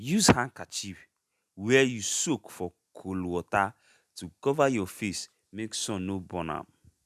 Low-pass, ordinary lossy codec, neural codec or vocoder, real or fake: 14.4 kHz; none; none; real